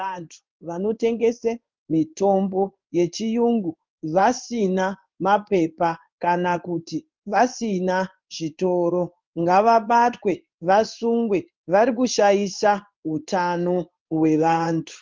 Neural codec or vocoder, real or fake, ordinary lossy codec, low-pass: codec, 16 kHz in and 24 kHz out, 1 kbps, XY-Tokenizer; fake; Opus, 24 kbps; 7.2 kHz